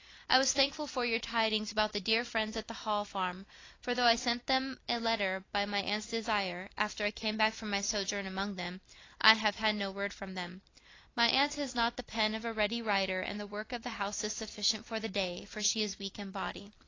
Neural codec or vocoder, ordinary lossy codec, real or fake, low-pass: none; AAC, 32 kbps; real; 7.2 kHz